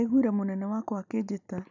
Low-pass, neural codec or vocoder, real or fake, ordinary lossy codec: 7.2 kHz; none; real; none